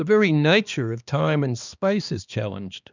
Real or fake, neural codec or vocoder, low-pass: fake; codec, 16 kHz, 2 kbps, X-Codec, HuBERT features, trained on balanced general audio; 7.2 kHz